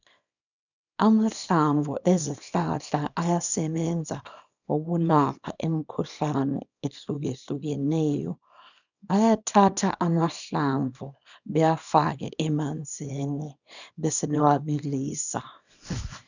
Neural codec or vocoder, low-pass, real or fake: codec, 24 kHz, 0.9 kbps, WavTokenizer, small release; 7.2 kHz; fake